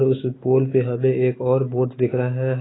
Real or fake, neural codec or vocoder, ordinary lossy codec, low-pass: real; none; AAC, 16 kbps; 7.2 kHz